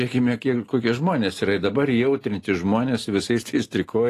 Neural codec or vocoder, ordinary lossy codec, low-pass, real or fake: none; AAC, 48 kbps; 14.4 kHz; real